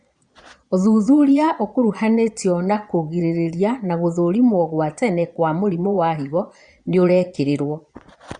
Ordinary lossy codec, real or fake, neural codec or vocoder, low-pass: Opus, 64 kbps; fake; vocoder, 22.05 kHz, 80 mel bands, Vocos; 9.9 kHz